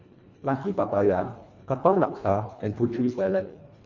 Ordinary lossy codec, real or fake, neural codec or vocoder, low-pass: Opus, 64 kbps; fake; codec, 24 kHz, 1.5 kbps, HILCodec; 7.2 kHz